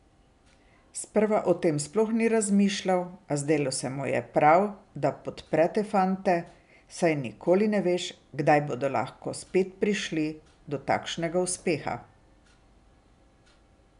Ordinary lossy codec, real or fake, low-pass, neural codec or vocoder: none; real; 10.8 kHz; none